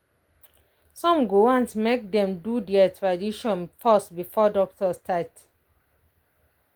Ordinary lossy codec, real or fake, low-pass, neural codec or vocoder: Opus, 24 kbps; real; 19.8 kHz; none